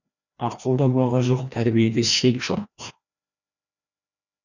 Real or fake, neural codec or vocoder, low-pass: fake; codec, 16 kHz, 1 kbps, FreqCodec, larger model; 7.2 kHz